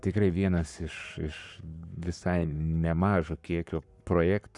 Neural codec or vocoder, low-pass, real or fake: codec, 44.1 kHz, 7.8 kbps, Pupu-Codec; 10.8 kHz; fake